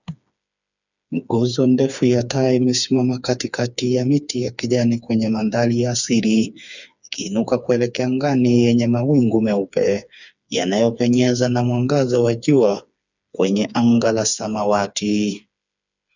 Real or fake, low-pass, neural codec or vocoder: fake; 7.2 kHz; codec, 16 kHz, 4 kbps, FreqCodec, smaller model